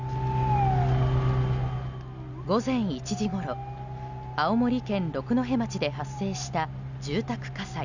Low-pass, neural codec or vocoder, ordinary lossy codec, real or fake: 7.2 kHz; none; none; real